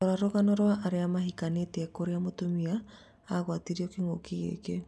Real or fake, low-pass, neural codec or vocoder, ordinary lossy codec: real; none; none; none